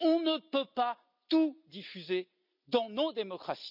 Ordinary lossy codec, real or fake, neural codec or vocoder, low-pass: none; real; none; 5.4 kHz